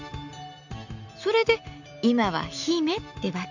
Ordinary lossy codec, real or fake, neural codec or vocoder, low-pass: none; real; none; 7.2 kHz